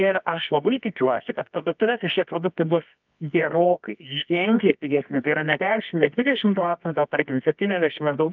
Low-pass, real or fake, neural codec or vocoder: 7.2 kHz; fake; codec, 24 kHz, 0.9 kbps, WavTokenizer, medium music audio release